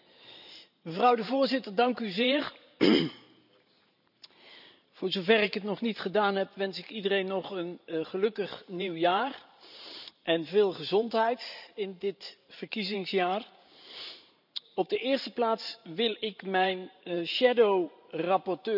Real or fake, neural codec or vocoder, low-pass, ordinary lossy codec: fake; vocoder, 44.1 kHz, 128 mel bands every 512 samples, BigVGAN v2; 5.4 kHz; none